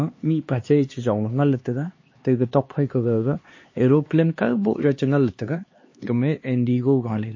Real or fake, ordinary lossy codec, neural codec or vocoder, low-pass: fake; MP3, 32 kbps; codec, 16 kHz, 2 kbps, X-Codec, HuBERT features, trained on LibriSpeech; 7.2 kHz